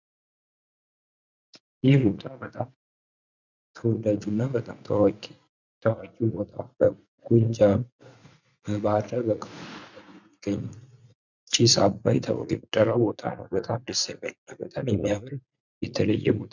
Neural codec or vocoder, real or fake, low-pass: vocoder, 44.1 kHz, 80 mel bands, Vocos; fake; 7.2 kHz